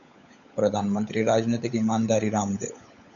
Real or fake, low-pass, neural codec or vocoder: fake; 7.2 kHz; codec, 16 kHz, 16 kbps, FunCodec, trained on LibriTTS, 50 frames a second